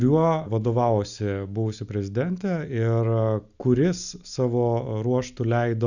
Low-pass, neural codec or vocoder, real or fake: 7.2 kHz; none; real